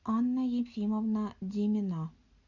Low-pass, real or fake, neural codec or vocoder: 7.2 kHz; real; none